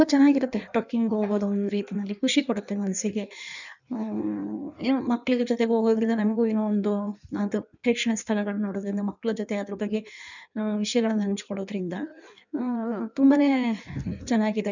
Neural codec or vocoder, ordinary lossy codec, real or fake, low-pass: codec, 16 kHz in and 24 kHz out, 1.1 kbps, FireRedTTS-2 codec; none; fake; 7.2 kHz